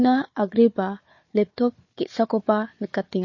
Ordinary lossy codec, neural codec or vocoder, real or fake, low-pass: MP3, 32 kbps; codec, 16 kHz, 4 kbps, FunCodec, trained on Chinese and English, 50 frames a second; fake; 7.2 kHz